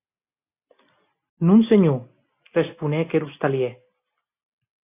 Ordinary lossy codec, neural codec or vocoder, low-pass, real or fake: AAC, 24 kbps; none; 3.6 kHz; real